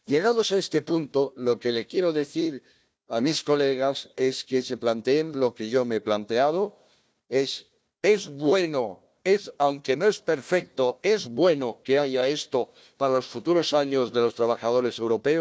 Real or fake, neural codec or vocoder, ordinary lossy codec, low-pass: fake; codec, 16 kHz, 1 kbps, FunCodec, trained on Chinese and English, 50 frames a second; none; none